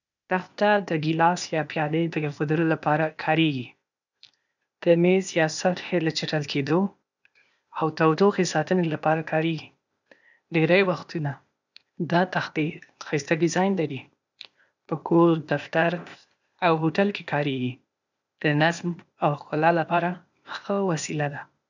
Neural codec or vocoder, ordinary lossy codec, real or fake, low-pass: codec, 16 kHz, 0.8 kbps, ZipCodec; none; fake; 7.2 kHz